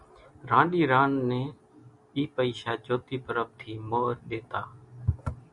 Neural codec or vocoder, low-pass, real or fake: none; 10.8 kHz; real